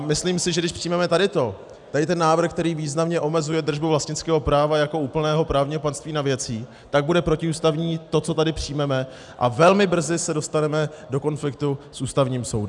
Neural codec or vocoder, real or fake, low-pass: none; real; 10.8 kHz